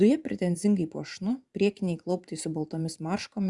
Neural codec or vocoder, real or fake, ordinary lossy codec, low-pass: vocoder, 44.1 kHz, 128 mel bands every 512 samples, BigVGAN v2; fake; Opus, 64 kbps; 10.8 kHz